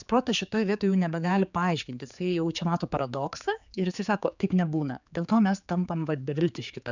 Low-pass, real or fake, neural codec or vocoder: 7.2 kHz; fake; codec, 16 kHz, 4 kbps, X-Codec, HuBERT features, trained on general audio